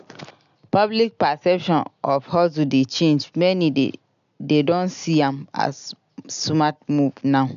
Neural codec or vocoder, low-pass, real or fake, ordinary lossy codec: none; 7.2 kHz; real; none